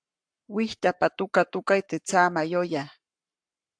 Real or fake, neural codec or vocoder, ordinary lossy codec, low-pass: fake; codec, 44.1 kHz, 7.8 kbps, Pupu-Codec; AAC, 64 kbps; 9.9 kHz